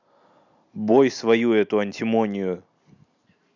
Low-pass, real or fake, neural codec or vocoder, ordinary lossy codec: 7.2 kHz; real; none; none